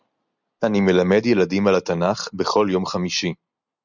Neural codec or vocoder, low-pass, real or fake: none; 7.2 kHz; real